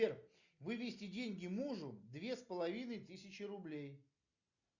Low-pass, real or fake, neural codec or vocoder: 7.2 kHz; real; none